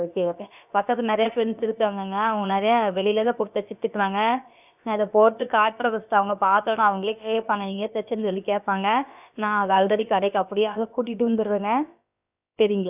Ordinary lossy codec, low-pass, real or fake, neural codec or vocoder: none; 3.6 kHz; fake; codec, 16 kHz, about 1 kbps, DyCAST, with the encoder's durations